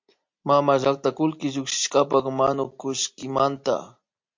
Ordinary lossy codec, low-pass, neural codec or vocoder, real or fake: MP3, 48 kbps; 7.2 kHz; none; real